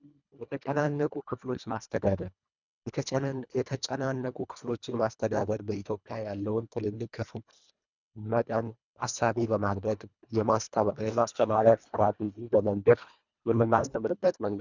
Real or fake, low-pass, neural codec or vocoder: fake; 7.2 kHz; codec, 24 kHz, 1.5 kbps, HILCodec